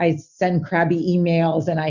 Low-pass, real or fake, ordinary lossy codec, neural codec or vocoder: 7.2 kHz; real; Opus, 64 kbps; none